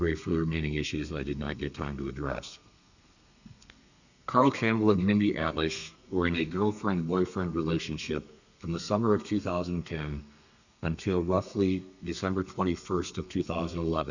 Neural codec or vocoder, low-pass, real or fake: codec, 32 kHz, 1.9 kbps, SNAC; 7.2 kHz; fake